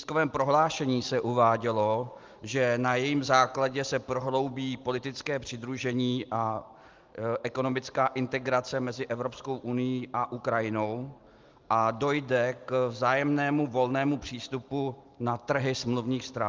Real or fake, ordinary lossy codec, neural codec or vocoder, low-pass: real; Opus, 32 kbps; none; 7.2 kHz